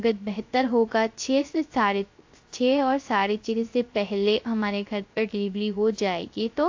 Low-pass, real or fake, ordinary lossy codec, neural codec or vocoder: 7.2 kHz; fake; AAC, 48 kbps; codec, 16 kHz, 0.3 kbps, FocalCodec